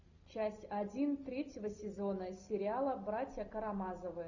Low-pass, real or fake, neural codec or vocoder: 7.2 kHz; real; none